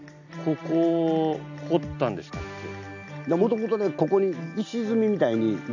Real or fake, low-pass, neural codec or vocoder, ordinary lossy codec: real; 7.2 kHz; none; none